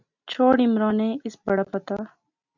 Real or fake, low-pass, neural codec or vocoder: real; 7.2 kHz; none